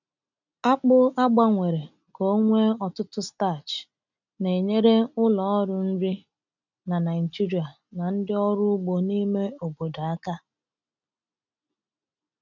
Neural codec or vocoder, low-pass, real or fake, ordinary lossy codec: none; 7.2 kHz; real; none